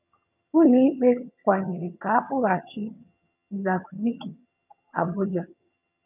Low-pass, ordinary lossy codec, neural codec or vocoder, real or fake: 3.6 kHz; none; vocoder, 22.05 kHz, 80 mel bands, HiFi-GAN; fake